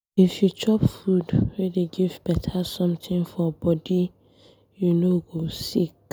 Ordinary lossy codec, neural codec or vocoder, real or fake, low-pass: none; none; real; none